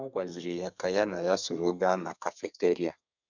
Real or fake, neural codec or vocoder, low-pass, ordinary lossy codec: fake; codec, 32 kHz, 1.9 kbps, SNAC; 7.2 kHz; none